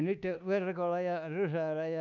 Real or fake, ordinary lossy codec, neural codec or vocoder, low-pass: fake; none; codec, 24 kHz, 1.2 kbps, DualCodec; 7.2 kHz